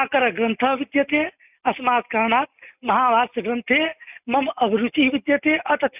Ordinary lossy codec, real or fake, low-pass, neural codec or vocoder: none; real; 3.6 kHz; none